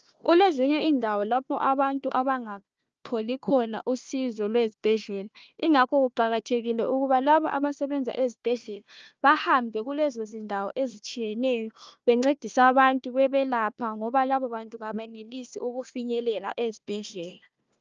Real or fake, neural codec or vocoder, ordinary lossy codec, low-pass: fake; codec, 16 kHz, 1 kbps, FunCodec, trained on Chinese and English, 50 frames a second; Opus, 24 kbps; 7.2 kHz